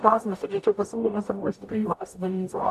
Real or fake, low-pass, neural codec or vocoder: fake; 14.4 kHz; codec, 44.1 kHz, 0.9 kbps, DAC